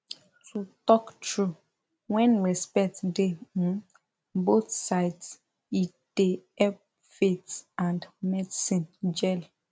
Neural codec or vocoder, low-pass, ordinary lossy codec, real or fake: none; none; none; real